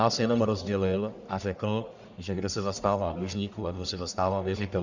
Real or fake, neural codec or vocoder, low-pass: fake; codec, 44.1 kHz, 1.7 kbps, Pupu-Codec; 7.2 kHz